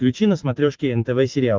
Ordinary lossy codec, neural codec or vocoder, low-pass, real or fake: Opus, 32 kbps; none; 7.2 kHz; real